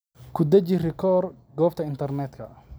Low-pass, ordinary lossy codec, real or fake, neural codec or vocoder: none; none; real; none